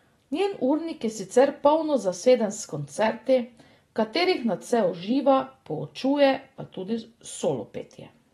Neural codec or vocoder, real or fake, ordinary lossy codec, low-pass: none; real; AAC, 32 kbps; 19.8 kHz